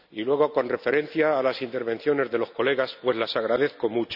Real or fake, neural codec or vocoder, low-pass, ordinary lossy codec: real; none; 5.4 kHz; none